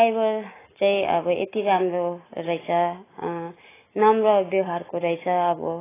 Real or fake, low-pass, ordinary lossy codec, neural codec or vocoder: real; 3.6 kHz; AAC, 16 kbps; none